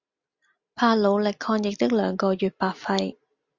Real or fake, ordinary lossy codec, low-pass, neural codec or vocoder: real; Opus, 64 kbps; 7.2 kHz; none